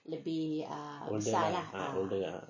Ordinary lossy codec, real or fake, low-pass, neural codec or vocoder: MP3, 32 kbps; real; 7.2 kHz; none